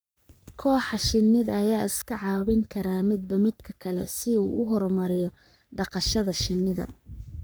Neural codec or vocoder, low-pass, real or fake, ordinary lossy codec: codec, 44.1 kHz, 3.4 kbps, Pupu-Codec; none; fake; none